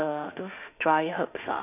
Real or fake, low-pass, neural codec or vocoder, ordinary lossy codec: fake; 3.6 kHz; autoencoder, 48 kHz, 32 numbers a frame, DAC-VAE, trained on Japanese speech; none